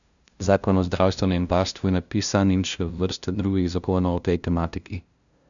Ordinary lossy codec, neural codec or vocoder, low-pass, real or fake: none; codec, 16 kHz, 0.5 kbps, FunCodec, trained on LibriTTS, 25 frames a second; 7.2 kHz; fake